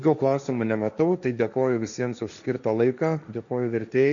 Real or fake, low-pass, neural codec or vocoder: fake; 7.2 kHz; codec, 16 kHz, 1.1 kbps, Voila-Tokenizer